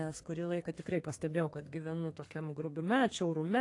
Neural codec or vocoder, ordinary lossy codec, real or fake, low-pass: codec, 32 kHz, 1.9 kbps, SNAC; AAC, 48 kbps; fake; 10.8 kHz